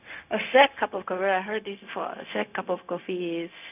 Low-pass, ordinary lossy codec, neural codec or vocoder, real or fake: 3.6 kHz; none; codec, 16 kHz, 0.4 kbps, LongCat-Audio-Codec; fake